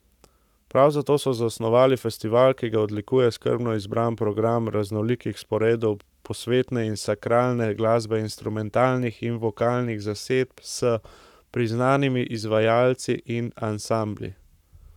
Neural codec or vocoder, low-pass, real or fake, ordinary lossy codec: codec, 44.1 kHz, 7.8 kbps, Pupu-Codec; 19.8 kHz; fake; none